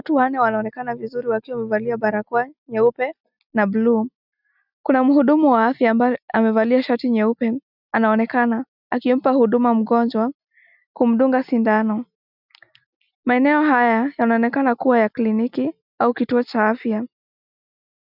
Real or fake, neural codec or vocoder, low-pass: real; none; 5.4 kHz